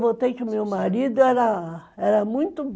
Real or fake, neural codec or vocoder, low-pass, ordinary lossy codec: real; none; none; none